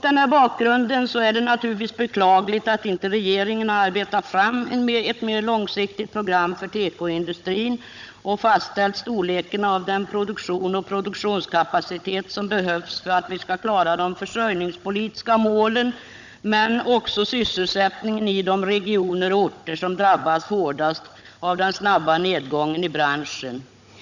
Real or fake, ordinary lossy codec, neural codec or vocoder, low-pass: fake; none; codec, 16 kHz, 16 kbps, FunCodec, trained on Chinese and English, 50 frames a second; 7.2 kHz